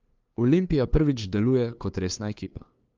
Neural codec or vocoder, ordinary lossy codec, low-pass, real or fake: codec, 16 kHz, 2 kbps, FunCodec, trained on LibriTTS, 25 frames a second; Opus, 32 kbps; 7.2 kHz; fake